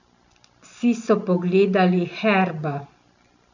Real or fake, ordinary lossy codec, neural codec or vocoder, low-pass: real; none; none; 7.2 kHz